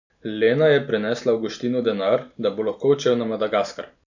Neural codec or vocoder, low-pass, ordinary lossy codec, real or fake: none; 7.2 kHz; none; real